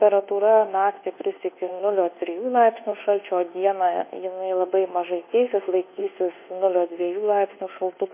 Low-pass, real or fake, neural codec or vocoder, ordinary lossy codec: 3.6 kHz; fake; codec, 24 kHz, 1.2 kbps, DualCodec; MP3, 24 kbps